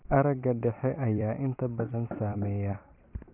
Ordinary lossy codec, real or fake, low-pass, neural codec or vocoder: none; fake; 3.6 kHz; vocoder, 44.1 kHz, 128 mel bands every 256 samples, BigVGAN v2